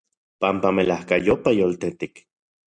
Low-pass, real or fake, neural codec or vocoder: 9.9 kHz; real; none